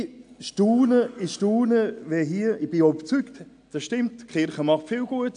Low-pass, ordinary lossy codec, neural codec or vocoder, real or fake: 9.9 kHz; AAC, 64 kbps; none; real